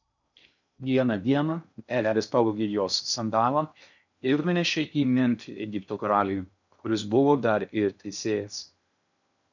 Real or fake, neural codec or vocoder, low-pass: fake; codec, 16 kHz in and 24 kHz out, 0.6 kbps, FocalCodec, streaming, 2048 codes; 7.2 kHz